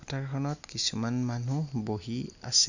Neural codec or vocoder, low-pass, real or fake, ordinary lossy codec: none; 7.2 kHz; real; none